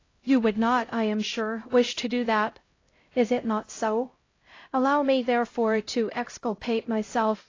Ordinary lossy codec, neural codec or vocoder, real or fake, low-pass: AAC, 32 kbps; codec, 16 kHz, 0.5 kbps, X-Codec, HuBERT features, trained on LibriSpeech; fake; 7.2 kHz